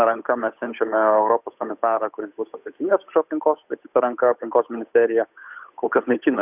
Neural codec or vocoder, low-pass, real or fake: codec, 16 kHz, 8 kbps, FunCodec, trained on Chinese and English, 25 frames a second; 3.6 kHz; fake